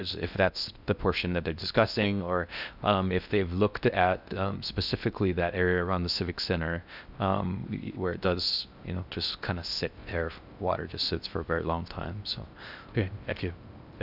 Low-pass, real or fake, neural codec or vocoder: 5.4 kHz; fake; codec, 16 kHz in and 24 kHz out, 0.6 kbps, FocalCodec, streaming, 4096 codes